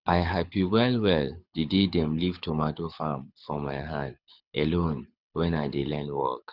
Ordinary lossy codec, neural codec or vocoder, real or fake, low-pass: Opus, 64 kbps; codec, 24 kHz, 6 kbps, HILCodec; fake; 5.4 kHz